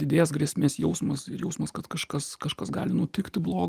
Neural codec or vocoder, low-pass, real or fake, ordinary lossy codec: vocoder, 44.1 kHz, 128 mel bands every 512 samples, BigVGAN v2; 14.4 kHz; fake; Opus, 24 kbps